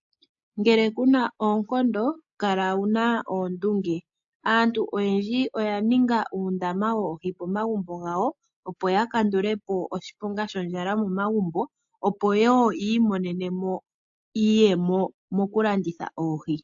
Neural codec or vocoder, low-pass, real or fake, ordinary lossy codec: none; 7.2 kHz; real; AAC, 64 kbps